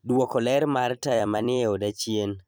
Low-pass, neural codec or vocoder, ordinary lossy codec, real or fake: none; vocoder, 44.1 kHz, 128 mel bands every 256 samples, BigVGAN v2; none; fake